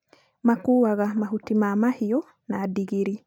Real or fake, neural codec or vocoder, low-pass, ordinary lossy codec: real; none; 19.8 kHz; none